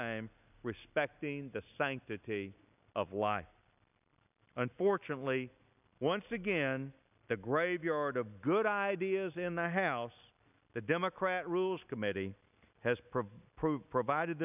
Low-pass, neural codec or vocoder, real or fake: 3.6 kHz; none; real